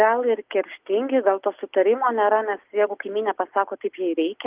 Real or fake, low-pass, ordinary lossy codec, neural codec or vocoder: real; 3.6 kHz; Opus, 32 kbps; none